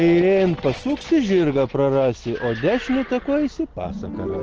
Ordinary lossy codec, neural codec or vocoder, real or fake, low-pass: Opus, 16 kbps; none; real; 7.2 kHz